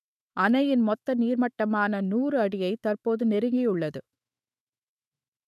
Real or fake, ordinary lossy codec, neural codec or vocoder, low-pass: fake; none; autoencoder, 48 kHz, 128 numbers a frame, DAC-VAE, trained on Japanese speech; 14.4 kHz